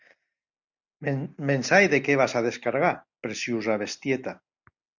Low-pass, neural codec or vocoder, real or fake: 7.2 kHz; none; real